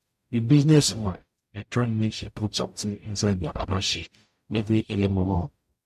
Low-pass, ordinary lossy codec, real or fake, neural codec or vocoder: 14.4 kHz; MP3, 64 kbps; fake; codec, 44.1 kHz, 0.9 kbps, DAC